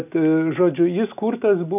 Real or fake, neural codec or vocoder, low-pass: real; none; 3.6 kHz